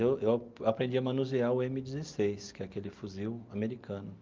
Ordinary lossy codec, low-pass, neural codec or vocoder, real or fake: Opus, 24 kbps; 7.2 kHz; none; real